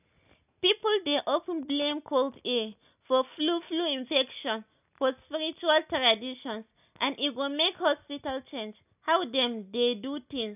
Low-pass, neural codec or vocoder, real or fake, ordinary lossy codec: 3.6 kHz; none; real; none